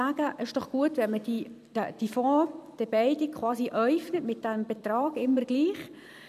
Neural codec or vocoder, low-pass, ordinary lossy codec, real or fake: vocoder, 44.1 kHz, 128 mel bands every 256 samples, BigVGAN v2; 14.4 kHz; none; fake